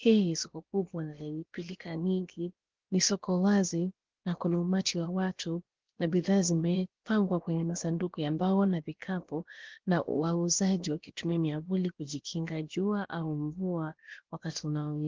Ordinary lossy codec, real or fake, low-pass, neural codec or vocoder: Opus, 16 kbps; fake; 7.2 kHz; codec, 16 kHz, about 1 kbps, DyCAST, with the encoder's durations